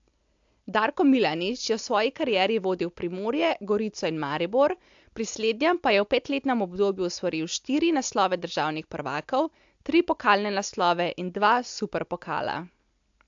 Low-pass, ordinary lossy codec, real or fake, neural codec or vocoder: 7.2 kHz; AAC, 64 kbps; real; none